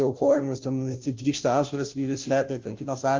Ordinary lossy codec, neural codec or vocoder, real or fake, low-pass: Opus, 32 kbps; codec, 16 kHz, 0.5 kbps, FunCodec, trained on Chinese and English, 25 frames a second; fake; 7.2 kHz